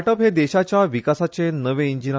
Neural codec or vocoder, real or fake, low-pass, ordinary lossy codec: none; real; none; none